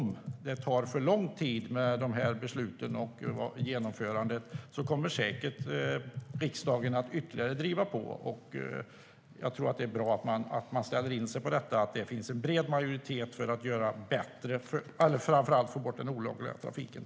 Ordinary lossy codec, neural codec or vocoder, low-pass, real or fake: none; none; none; real